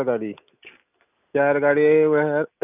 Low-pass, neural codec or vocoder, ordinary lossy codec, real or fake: 3.6 kHz; none; none; real